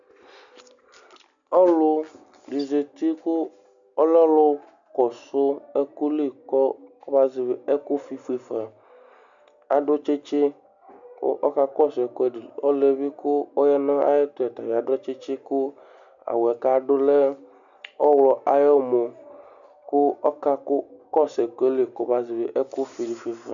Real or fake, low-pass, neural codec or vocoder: real; 7.2 kHz; none